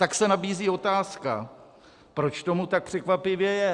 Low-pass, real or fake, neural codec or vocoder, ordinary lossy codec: 10.8 kHz; real; none; Opus, 64 kbps